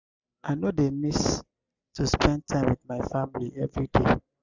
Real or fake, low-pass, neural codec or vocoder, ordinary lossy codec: real; 7.2 kHz; none; Opus, 64 kbps